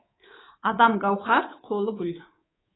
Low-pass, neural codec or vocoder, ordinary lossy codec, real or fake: 7.2 kHz; codec, 24 kHz, 3.1 kbps, DualCodec; AAC, 16 kbps; fake